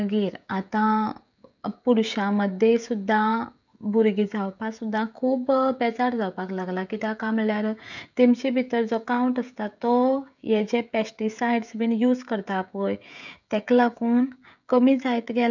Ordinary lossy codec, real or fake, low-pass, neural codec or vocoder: none; fake; 7.2 kHz; codec, 16 kHz, 16 kbps, FreqCodec, smaller model